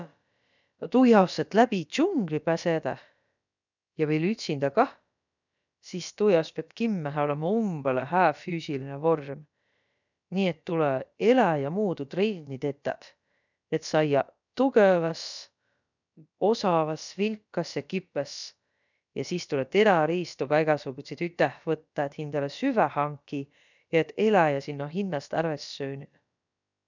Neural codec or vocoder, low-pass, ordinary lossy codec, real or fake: codec, 16 kHz, about 1 kbps, DyCAST, with the encoder's durations; 7.2 kHz; none; fake